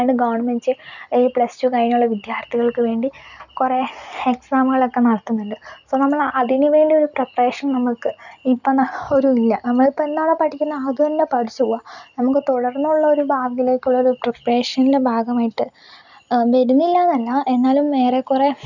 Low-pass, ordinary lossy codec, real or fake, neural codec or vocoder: 7.2 kHz; none; real; none